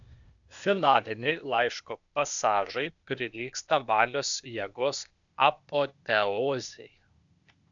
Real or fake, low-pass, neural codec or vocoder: fake; 7.2 kHz; codec, 16 kHz, 0.8 kbps, ZipCodec